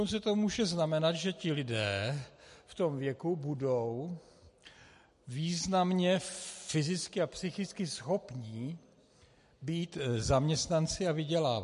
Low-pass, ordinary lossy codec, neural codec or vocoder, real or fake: 10.8 kHz; MP3, 48 kbps; none; real